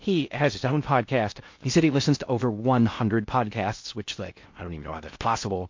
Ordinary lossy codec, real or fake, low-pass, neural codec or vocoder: MP3, 48 kbps; fake; 7.2 kHz; codec, 16 kHz in and 24 kHz out, 0.6 kbps, FocalCodec, streaming, 2048 codes